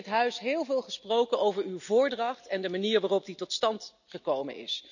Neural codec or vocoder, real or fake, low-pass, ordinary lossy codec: none; real; 7.2 kHz; none